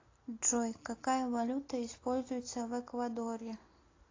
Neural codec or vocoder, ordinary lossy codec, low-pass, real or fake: none; AAC, 32 kbps; 7.2 kHz; real